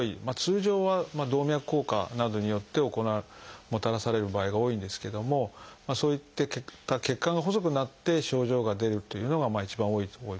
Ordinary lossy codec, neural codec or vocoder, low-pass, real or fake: none; none; none; real